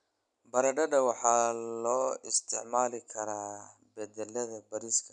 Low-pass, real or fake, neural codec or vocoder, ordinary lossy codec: 10.8 kHz; real; none; none